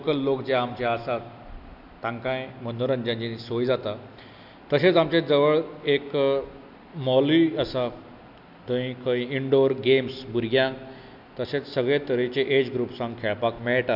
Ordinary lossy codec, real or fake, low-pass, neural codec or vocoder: none; real; 5.4 kHz; none